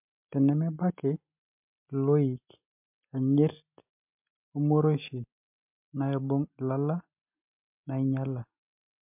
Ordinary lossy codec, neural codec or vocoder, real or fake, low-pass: MP3, 32 kbps; none; real; 3.6 kHz